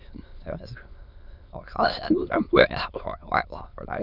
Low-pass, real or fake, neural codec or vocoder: 5.4 kHz; fake; autoencoder, 22.05 kHz, a latent of 192 numbers a frame, VITS, trained on many speakers